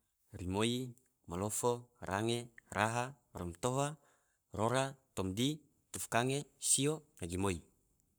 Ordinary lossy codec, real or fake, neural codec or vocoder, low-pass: none; fake; codec, 44.1 kHz, 7.8 kbps, Pupu-Codec; none